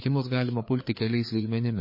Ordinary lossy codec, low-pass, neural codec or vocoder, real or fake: MP3, 24 kbps; 5.4 kHz; codec, 16 kHz, 4 kbps, FreqCodec, larger model; fake